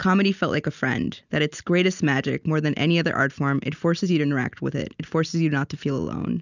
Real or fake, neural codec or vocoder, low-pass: real; none; 7.2 kHz